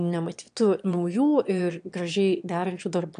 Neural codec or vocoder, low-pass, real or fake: autoencoder, 22.05 kHz, a latent of 192 numbers a frame, VITS, trained on one speaker; 9.9 kHz; fake